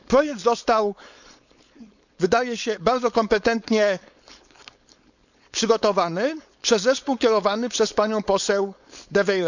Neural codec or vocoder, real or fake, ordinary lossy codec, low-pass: codec, 16 kHz, 4.8 kbps, FACodec; fake; none; 7.2 kHz